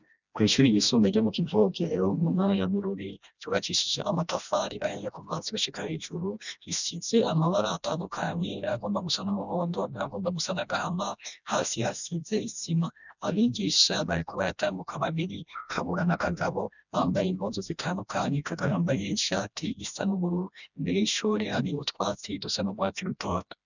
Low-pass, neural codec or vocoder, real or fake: 7.2 kHz; codec, 16 kHz, 1 kbps, FreqCodec, smaller model; fake